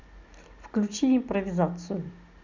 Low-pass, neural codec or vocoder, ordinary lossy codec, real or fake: 7.2 kHz; none; none; real